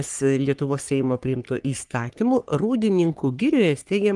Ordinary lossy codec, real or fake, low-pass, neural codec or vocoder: Opus, 32 kbps; fake; 10.8 kHz; codec, 44.1 kHz, 3.4 kbps, Pupu-Codec